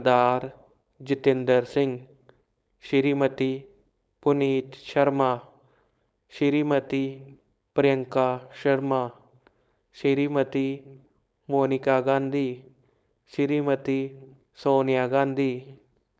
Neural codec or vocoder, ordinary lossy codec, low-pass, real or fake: codec, 16 kHz, 4.8 kbps, FACodec; none; none; fake